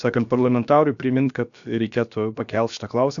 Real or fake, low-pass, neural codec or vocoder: fake; 7.2 kHz; codec, 16 kHz, about 1 kbps, DyCAST, with the encoder's durations